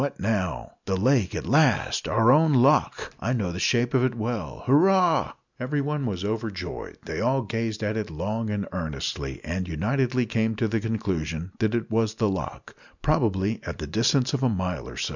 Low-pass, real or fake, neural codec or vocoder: 7.2 kHz; real; none